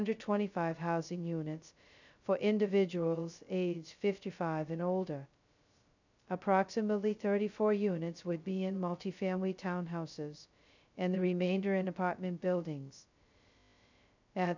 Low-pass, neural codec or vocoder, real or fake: 7.2 kHz; codec, 16 kHz, 0.2 kbps, FocalCodec; fake